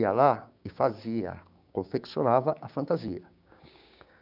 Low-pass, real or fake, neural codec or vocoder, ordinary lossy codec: 5.4 kHz; fake; codec, 16 kHz, 6 kbps, DAC; none